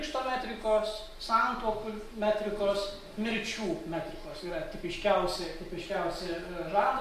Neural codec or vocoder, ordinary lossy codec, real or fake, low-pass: none; MP3, 64 kbps; real; 14.4 kHz